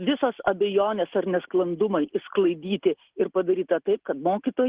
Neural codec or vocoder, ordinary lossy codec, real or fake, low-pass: none; Opus, 16 kbps; real; 3.6 kHz